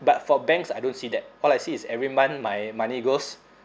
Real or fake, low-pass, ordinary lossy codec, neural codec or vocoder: real; none; none; none